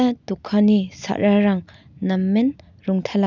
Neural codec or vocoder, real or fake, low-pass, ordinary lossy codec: none; real; 7.2 kHz; none